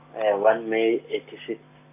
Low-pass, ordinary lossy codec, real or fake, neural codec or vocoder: 3.6 kHz; none; real; none